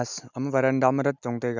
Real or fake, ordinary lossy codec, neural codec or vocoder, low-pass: real; none; none; 7.2 kHz